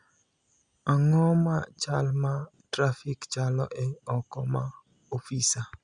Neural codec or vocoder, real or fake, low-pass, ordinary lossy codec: none; real; 9.9 kHz; Opus, 64 kbps